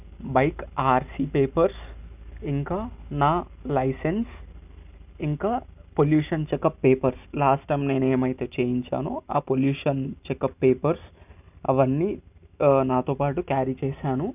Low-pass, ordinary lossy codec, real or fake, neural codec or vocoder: 3.6 kHz; none; real; none